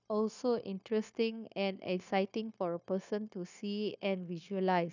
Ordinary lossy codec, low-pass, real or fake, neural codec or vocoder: none; 7.2 kHz; fake; codec, 16 kHz, 0.9 kbps, LongCat-Audio-Codec